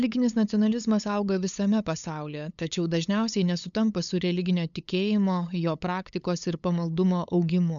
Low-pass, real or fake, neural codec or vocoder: 7.2 kHz; fake; codec, 16 kHz, 8 kbps, FunCodec, trained on LibriTTS, 25 frames a second